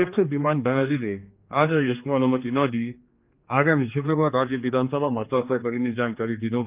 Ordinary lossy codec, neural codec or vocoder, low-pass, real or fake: Opus, 24 kbps; codec, 16 kHz, 2 kbps, X-Codec, HuBERT features, trained on general audio; 3.6 kHz; fake